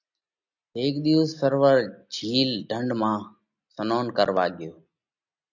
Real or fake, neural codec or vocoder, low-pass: real; none; 7.2 kHz